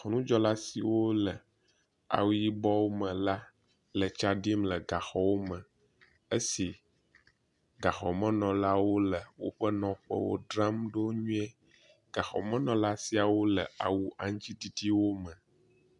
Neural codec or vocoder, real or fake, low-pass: none; real; 10.8 kHz